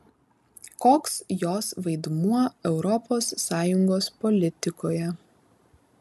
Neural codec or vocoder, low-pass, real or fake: none; 14.4 kHz; real